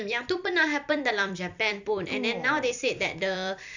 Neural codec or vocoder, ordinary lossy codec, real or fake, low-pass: vocoder, 44.1 kHz, 128 mel bands every 256 samples, BigVGAN v2; none; fake; 7.2 kHz